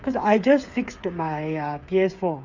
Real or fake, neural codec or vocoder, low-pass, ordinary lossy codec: fake; codec, 16 kHz, 8 kbps, FreqCodec, smaller model; 7.2 kHz; Opus, 64 kbps